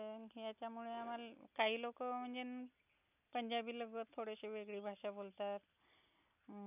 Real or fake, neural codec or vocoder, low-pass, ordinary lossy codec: real; none; 3.6 kHz; none